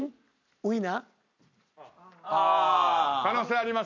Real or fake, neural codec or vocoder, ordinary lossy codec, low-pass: real; none; none; 7.2 kHz